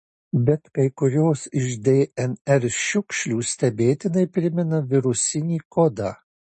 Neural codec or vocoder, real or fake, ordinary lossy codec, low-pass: none; real; MP3, 32 kbps; 10.8 kHz